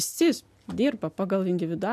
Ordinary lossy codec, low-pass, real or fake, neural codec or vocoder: AAC, 96 kbps; 14.4 kHz; real; none